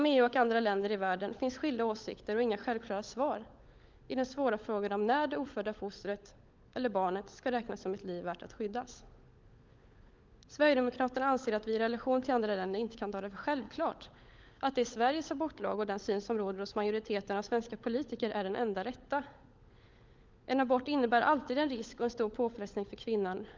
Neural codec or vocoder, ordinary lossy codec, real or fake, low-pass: codec, 16 kHz, 16 kbps, FunCodec, trained on LibriTTS, 50 frames a second; Opus, 24 kbps; fake; 7.2 kHz